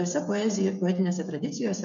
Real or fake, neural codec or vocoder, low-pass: fake; codec, 16 kHz, 16 kbps, FreqCodec, smaller model; 7.2 kHz